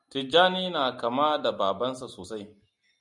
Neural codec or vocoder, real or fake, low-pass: none; real; 10.8 kHz